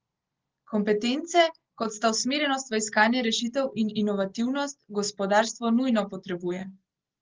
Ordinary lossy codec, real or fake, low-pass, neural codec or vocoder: Opus, 16 kbps; real; 7.2 kHz; none